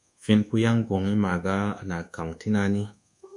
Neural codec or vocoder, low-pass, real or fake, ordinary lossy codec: codec, 24 kHz, 1.2 kbps, DualCodec; 10.8 kHz; fake; MP3, 96 kbps